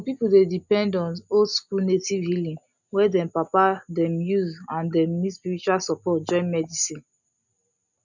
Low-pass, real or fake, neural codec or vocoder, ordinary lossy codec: 7.2 kHz; real; none; none